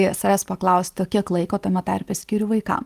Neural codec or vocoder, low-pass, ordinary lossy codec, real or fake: none; 14.4 kHz; Opus, 24 kbps; real